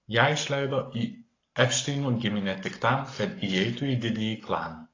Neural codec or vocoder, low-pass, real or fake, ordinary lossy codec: codec, 44.1 kHz, 7.8 kbps, Pupu-Codec; 7.2 kHz; fake; AAC, 32 kbps